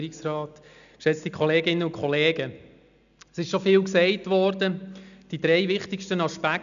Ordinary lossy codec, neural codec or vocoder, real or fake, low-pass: none; none; real; 7.2 kHz